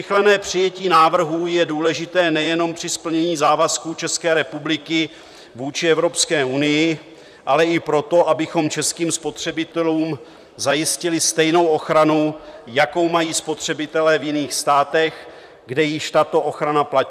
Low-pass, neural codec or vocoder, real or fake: 14.4 kHz; vocoder, 44.1 kHz, 128 mel bands, Pupu-Vocoder; fake